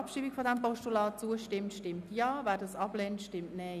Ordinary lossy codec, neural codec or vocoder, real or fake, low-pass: none; none; real; 14.4 kHz